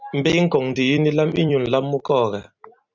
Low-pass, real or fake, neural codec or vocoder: 7.2 kHz; fake; vocoder, 44.1 kHz, 128 mel bands every 256 samples, BigVGAN v2